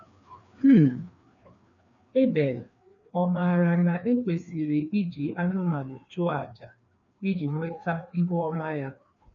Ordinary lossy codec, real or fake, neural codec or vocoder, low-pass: none; fake; codec, 16 kHz, 2 kbps, FreqCodec, larger model; 7.2 kHz